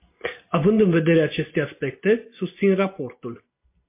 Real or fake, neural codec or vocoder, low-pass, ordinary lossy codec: real; none; 3.6 kHz; MP3, 24 kbps